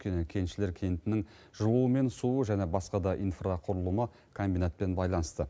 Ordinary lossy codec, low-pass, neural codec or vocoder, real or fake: none; none; none; real